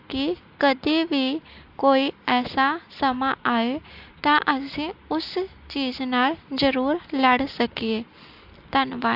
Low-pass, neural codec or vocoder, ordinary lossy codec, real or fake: 5.4 kHz; none; AAC, 48 kbps; real